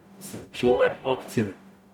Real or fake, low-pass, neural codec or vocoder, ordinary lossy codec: fake; 19.8 kHz; codec, 44.1 kHz, 0.9 kbps, DAC; none